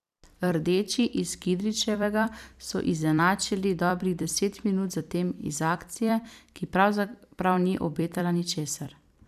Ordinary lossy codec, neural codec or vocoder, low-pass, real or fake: none; vocoder, 44.1 kHz, 128 mel bands every 256 samples, BigVGAN v2; 14.4 kHz; fake